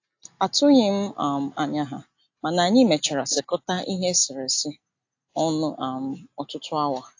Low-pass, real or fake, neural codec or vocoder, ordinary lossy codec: 7.2 kHz; real; none; AAC, 48 kbps